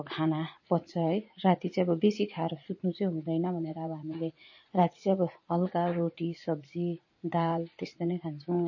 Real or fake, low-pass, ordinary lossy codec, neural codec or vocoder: fake; 7.2 kHz; MP3, 32 kbps; vocoder, 44.1 kHz, 80 mel bands, Vocos